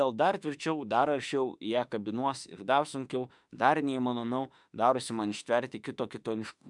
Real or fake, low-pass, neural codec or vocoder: fake; 10.8 kHz; autoencoder, 48 kHz, 32 numbers a frame, DAC-VAE, trained on Japanese speech